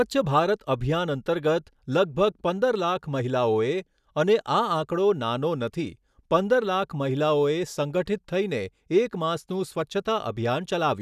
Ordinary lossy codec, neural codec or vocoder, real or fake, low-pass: none; none; real; 14.4 kHz